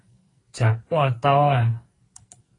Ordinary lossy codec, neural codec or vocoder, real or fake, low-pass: AAC, 32 kbps; codec, 44.1 kHz, 2.6 kbps, SNAC; fake; 10.8 kHz